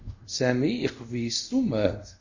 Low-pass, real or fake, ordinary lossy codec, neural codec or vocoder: 7.2 kHz; fake; Opus, 64 kbps; codec, 24 kHz, 0.5 kbps, DualCodec